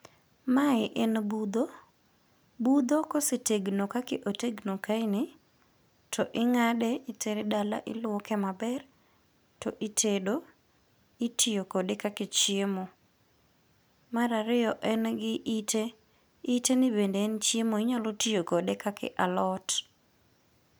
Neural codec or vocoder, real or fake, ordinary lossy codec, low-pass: none; real; none; none